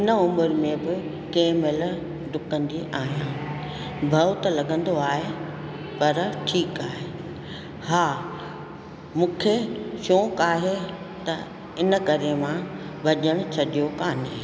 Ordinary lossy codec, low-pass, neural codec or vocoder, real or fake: none; none; none; real